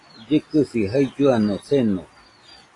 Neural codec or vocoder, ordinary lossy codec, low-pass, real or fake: none; AAC, 32 kbps; 10.8 kHz; real